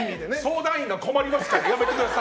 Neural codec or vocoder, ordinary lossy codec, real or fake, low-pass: none; none; real; none